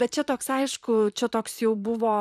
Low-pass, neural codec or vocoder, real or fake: 14.4 kHz; vocoder, 44.1 kHz, 128 mel bands, Pupu-Vocoder; fake